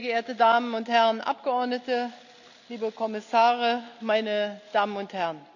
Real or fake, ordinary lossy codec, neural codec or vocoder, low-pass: real; none; none; 7.2 kHz